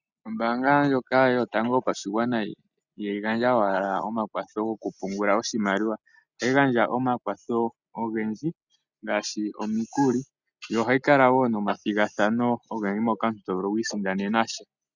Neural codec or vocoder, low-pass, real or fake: none; 7.2 kHz; real